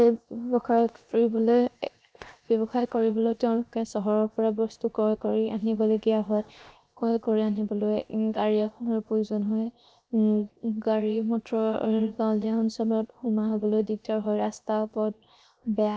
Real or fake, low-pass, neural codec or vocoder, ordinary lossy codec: fake; none; codec, 16 kHz, 0.7 kbps, FocalCodec; none